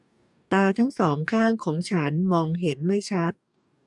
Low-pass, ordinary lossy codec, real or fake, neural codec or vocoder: 10.8 kHz; none; fake; codec, 44.1 kHz, 2.6 kbps, DAC